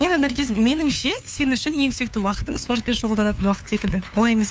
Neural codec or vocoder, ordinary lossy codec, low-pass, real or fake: codec, 16 kHz, 2 kbps, FunCodec, trained on LibriTTS, 25 frames a second; none; none; fake